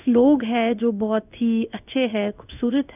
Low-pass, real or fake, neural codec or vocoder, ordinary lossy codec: 3.6 kHz; fake; codec, 16 kHz in and 24 kHz out, 1 kbps, XY-Tokenizer; none